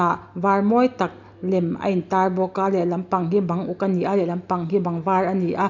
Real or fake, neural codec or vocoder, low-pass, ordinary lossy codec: real; none; 7.2 kHz; none